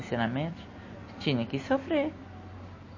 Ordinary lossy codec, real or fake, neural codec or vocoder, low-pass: MP3, 32 kbps; real; none; 7.2 kHz